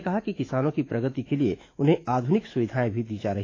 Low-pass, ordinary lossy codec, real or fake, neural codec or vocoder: 7.2 kHz; AAC, 32 kbps; fake; autoencoder, 48 kHz, 128 numbers a frame, DAC-VAE, trained on Japanese speech